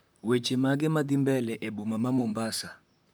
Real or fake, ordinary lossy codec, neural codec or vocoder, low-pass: fake; none; vocoder, 44.1 kHz, 128 mel bands, Pupu-Vocoder; none